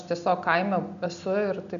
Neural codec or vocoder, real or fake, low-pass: none; real; 7.2 kHz